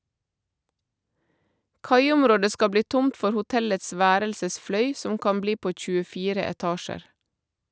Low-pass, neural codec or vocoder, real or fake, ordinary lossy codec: none; none; real; none